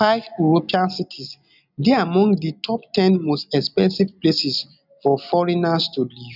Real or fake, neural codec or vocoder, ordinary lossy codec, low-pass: real; none; none; 5.4 kHz